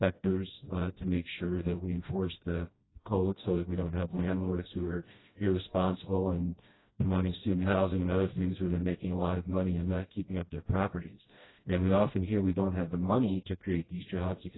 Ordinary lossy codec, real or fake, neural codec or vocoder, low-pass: AAC, 16 kbps; fake; codec, 16 kHz, 1 kbps, FreqCodec, smaller model; 7.2 kHz